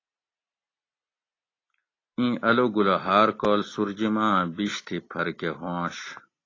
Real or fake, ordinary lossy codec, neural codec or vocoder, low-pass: real; AAC, 32 kbps; none; 7.2 kHz